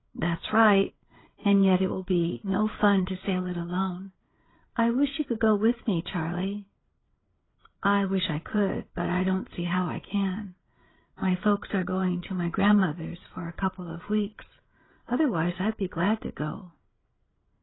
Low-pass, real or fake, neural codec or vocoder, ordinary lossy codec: 7.2 kHz; fake; codec, 16 kHz, 8 kbps, FreqCodec, larger model; AAC, 16 kbps